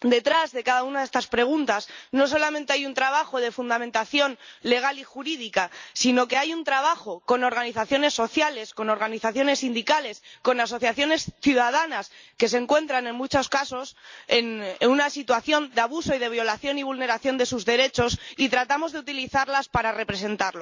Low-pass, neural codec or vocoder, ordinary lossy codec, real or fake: 7.2 kHz; none; MP3, 64 kbps; real